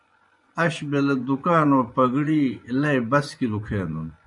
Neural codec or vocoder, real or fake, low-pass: vocoder, 24 kHz, 100 mel bands, Vocos; fake; 10.8 kHz